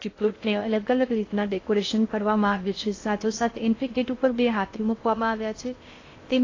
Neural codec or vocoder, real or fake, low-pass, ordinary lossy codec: codec, 16 kHz in and 24 kHz out, 0.6 kbps, FocalCodec, streaming, 2048 codes; fake; 7.2 kHz; AAC, 32 kbps